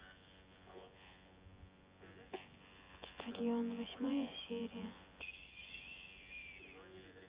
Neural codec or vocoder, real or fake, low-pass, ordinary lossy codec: vocoder, 24 kHz, 100 mel bands, Vocos; fake; 3.6 kHz; Opus, 64 kbps